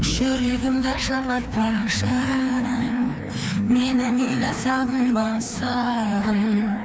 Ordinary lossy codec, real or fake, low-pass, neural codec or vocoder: none; fake; none; codec, 16 kHz, 2 kbps, FreqCodec, larger model